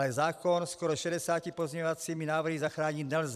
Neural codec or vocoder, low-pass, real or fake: vocoder, 44.1 kHz, 128 mel bands every 256 samples, BigVGAN v2; 14.4 kHz; fake